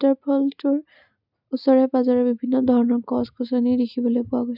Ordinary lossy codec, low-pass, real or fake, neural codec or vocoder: none; 5.4 kHz; real; none